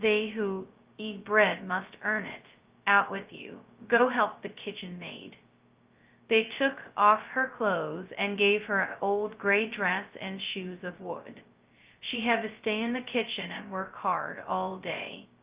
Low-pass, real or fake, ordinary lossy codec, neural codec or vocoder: 3.6 kHz; fake; Opus, 24 kbps; codec, 16 kHz, 0.2 kbps, FocalCodec